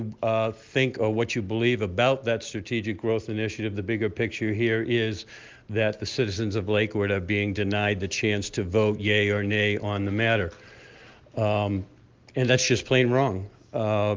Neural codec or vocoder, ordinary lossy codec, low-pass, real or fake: none; Opus, 32 kbps; 7.2 kHz; real